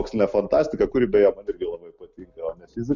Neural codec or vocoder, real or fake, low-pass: none; real; 7.2 kHz